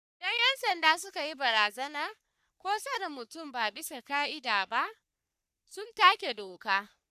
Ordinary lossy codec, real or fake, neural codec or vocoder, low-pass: none; fake; codec, 44.1 kHz, 3.4 kbps, Pupu-Codec; 14.4 kHz